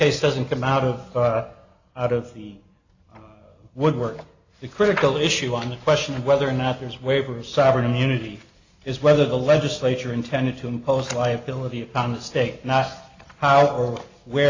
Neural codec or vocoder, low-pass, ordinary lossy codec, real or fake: none; 7.2 kHz; AAC, 48 kbps; real